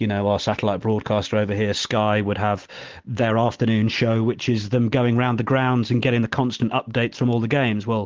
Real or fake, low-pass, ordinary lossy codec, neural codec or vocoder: real; 7.2 kHz; Opus, 16 kbps; none